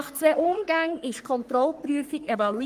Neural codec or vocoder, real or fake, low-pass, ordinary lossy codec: codec, 44.1 kHz, 3.4 kbps, Pupu-Codec; fake; 14.4 kHz; Opus, 32 kbps